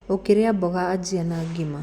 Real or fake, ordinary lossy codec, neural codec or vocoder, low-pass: real; Opus, 64 kbps; none; 19.8 kHz